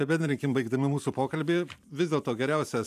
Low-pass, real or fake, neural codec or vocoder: 14.4 kHz; fake; vocoder, 44.1 kHz, 128 mel bands every 512 samples, BigVGAN v2